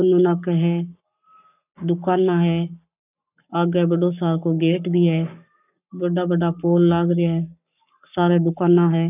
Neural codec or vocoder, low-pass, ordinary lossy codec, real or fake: codec, 44.1 kHz, 7.8 kbps, Pupu-Codec; 3.6 kHz; none; fake